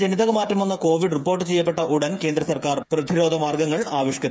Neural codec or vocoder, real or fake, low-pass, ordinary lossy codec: codec, 16 kHz, 8 kbps, FreqCodec, smaller model; fake; none; none